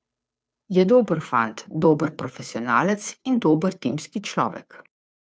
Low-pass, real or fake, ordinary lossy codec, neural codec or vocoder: none; fake; none; codec, 16 kHz, 2 kbps, FunCodec, trained on Chinese and English, 25 frames a second